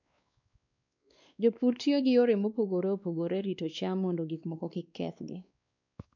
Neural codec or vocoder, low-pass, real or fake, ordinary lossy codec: codec, 16 kHz, 2 kbps, X-Codec, WavLM features, trained on Multilingual LibriSpeech; 7.2 kHz; fake; none